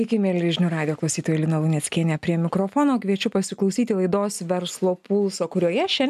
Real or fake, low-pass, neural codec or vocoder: real; 14.4 kHz; none